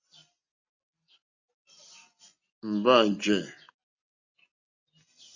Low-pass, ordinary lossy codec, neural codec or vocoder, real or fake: 7.2 kHz; MP3, 64 kbps; none; real